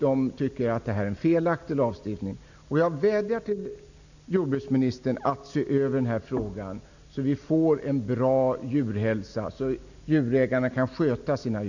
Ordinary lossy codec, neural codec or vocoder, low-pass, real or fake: none; none; 7.2 kHz; real